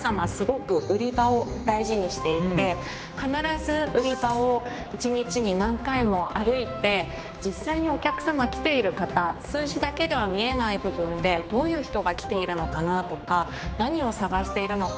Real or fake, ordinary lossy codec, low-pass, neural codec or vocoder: fake; none; none; codec, 16 kHz, 2 kbps, X-Codec, HuBERT features, trained on general audio